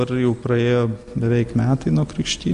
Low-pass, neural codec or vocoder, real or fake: 10.8 kHz; none; real